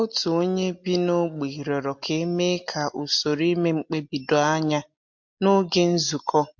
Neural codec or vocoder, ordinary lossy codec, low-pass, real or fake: none; MP3, 48 kbps; 7.2 kHz; real